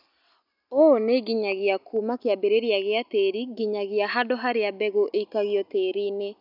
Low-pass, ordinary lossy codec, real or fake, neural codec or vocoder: 5.4 kHz; none; real; none